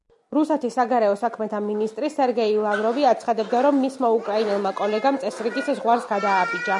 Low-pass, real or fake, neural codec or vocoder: 10.8 kHz; real; none